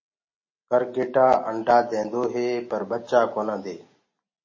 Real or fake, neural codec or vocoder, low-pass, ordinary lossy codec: real; none; 7.2 kHz; MP3, 32 kbps